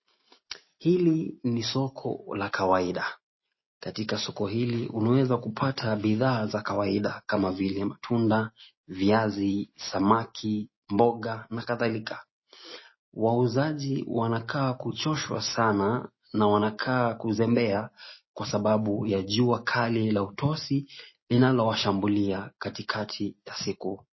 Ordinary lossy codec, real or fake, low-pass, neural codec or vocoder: MP3, 24 kbps; real; 7.2 kHz; none